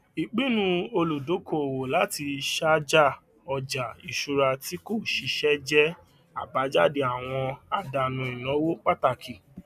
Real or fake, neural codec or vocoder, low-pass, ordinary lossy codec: real; none; 14.4 kHz; none